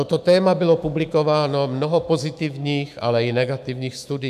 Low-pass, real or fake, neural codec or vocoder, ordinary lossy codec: 14.4 kHz; fake; autoencoder, 48 kHz, 128 numbers a frame, DAC-VAE, trained on Japanese speech; MP3, 96 kbps